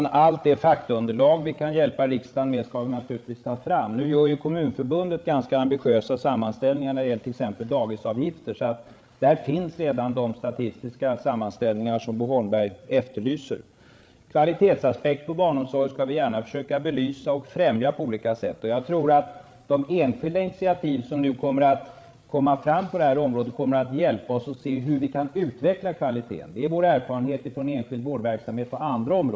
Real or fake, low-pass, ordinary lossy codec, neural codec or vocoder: fake; none; none; codec, 16 kHz, 8 kbps, FreqCodec, larger model